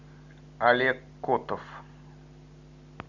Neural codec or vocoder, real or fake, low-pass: none; real; 7.2 kHz